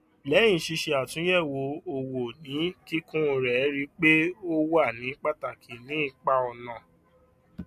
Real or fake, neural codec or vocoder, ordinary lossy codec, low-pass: real; none; MP3, 64 kbps; 14.4 kHz